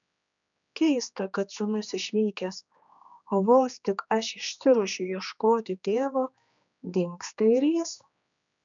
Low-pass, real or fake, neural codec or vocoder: 7.2 kHz; fake; codec, 16 kHz, 2 kbps, X-Codec, HuBERT features, trained on general audio